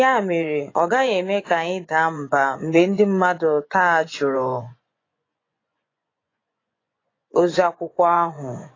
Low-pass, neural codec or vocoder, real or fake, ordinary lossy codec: 7.2 kHz; vocoder, 24 kHz, 100 mel bands, Vocos; fake; AAC, 32 kbps